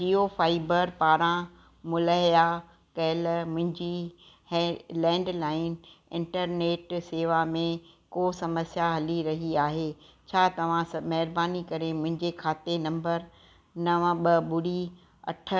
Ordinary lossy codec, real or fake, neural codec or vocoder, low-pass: none; real; none; none